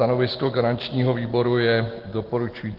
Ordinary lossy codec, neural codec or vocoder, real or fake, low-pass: Opus, 16 kbps; none; real; 5.4 kHz